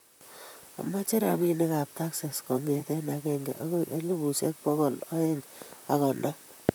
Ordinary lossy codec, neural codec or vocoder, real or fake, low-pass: none; vocoder, 44.1 kHz, 128 mel bands, Pupu-Vocoder; fake; none